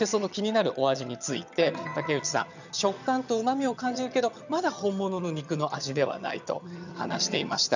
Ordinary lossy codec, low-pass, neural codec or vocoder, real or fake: none; 7.2 kHz; vocoder, 22.05 kHz, 80 mel bands, HiFi-GAN; fake